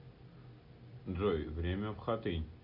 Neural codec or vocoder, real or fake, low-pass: none; real; 5.4 kHz